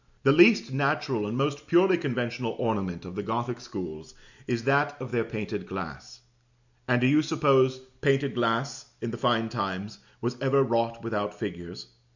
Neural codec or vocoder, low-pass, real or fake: none; 7.2 kHz; real